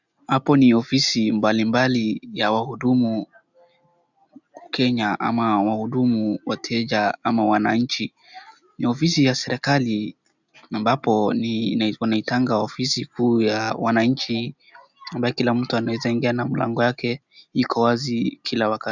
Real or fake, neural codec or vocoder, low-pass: real; none; 7.2 kHz